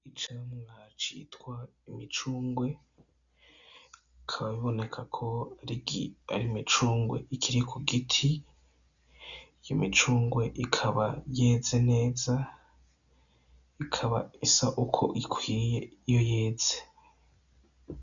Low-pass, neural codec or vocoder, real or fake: 7.2 kHz; none; real